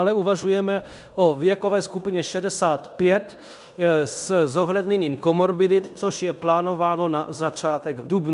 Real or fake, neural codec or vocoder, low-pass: fake; codec, 16 kHz in and 24 kHz out, 0.9 kbps, LongCat-Audio-Codec, fine tuned four codebook decoder; 10.8 kHz